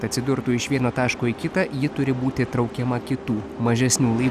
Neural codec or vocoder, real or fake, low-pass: none; real; 14.4 kHz